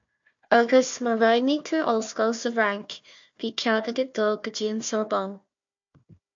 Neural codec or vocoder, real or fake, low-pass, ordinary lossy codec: codec, 16 kHz, 1 kbps, FunCodec, trained on Chinese and English, 50 frames a second; fake; 7.2 kHz; MP3, 48 kbps